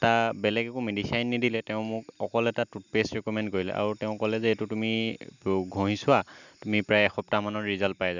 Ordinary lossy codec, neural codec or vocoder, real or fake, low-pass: none; none; real; 7.2 kHz